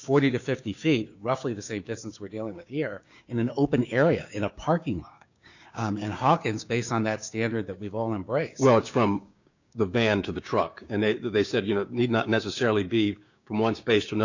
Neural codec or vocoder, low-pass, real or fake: codec, 16 kHz, 6 kbps, DAC; 7.2 kHz; fake